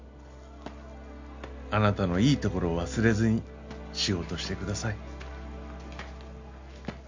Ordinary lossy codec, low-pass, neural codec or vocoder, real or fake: MP3, 48 kbps; 7.2 kHz; none; real